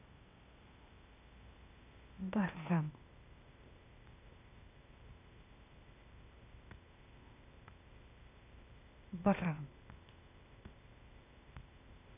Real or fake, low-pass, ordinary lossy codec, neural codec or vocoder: fake; 3.6 kHz; none; codec, 16 kHz, 0.8 kbps, ZipCodec